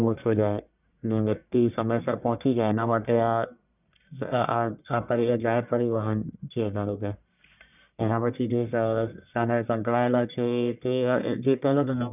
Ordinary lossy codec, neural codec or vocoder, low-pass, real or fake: none; codec, 44.1 kHz, 1.7 kbps, Pupu-Codec; 3.6 kHz; fake